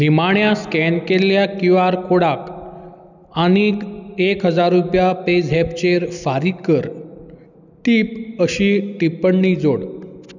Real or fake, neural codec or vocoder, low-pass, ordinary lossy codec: real; none; 7.2 kHz; none